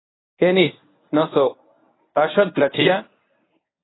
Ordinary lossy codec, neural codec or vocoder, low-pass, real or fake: AAC, 16 kbps; codec, 24 kHz, 0.9 kbps, WavTokenizer, medium speech release version 1; 7.2 kHz; fake